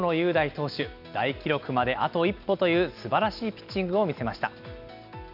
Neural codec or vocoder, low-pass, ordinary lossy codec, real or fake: none; 5.4 kHz; none; real